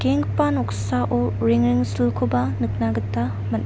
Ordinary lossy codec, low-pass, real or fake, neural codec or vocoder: none; none; real; none